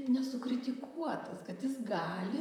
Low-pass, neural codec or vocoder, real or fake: 19.8 kHz; vocoder, 44.1 kHz, 128 mel bands, Pupu-Vocoder; fake